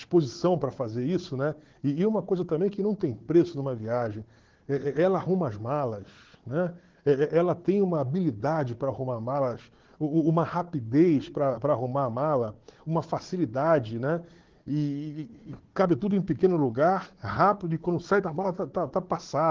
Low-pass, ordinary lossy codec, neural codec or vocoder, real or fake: 7.2 kHz; Opus, 16 kbps; codec, 16 kHz, 4 kbps, FunCodec, trained on Chinese and English, 50 frames a second; fake